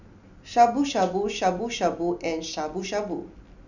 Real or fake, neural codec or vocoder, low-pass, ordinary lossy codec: real; none; 7.2 kHz; none